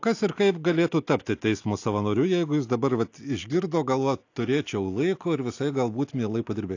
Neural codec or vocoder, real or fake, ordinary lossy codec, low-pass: none; real; AAC, 48 kbps; 7.2 kHz